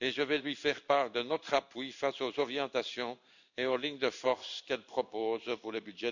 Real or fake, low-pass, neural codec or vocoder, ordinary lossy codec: fake; 7.2 kHz; codec, 16 kHz in and 24 kHz out, 1 kbps, XY-Tokenizer; none